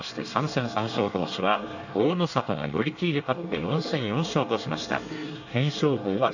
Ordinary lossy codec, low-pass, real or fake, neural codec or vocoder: none; 7.2 kHz; fake; codec, 24 kHz, 1 kbps, SNAC